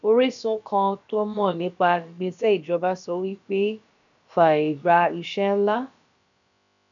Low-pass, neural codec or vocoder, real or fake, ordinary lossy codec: 7.2 kHz; codec, 16 kHz, about 1 kbps, DyCAST, with the encoder's durations; fake; none